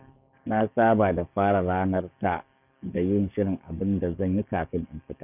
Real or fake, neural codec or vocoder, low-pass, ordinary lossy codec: fake; codec, 44.1 kHz, 7.8 kbps, DAC; 3.6 kHz; none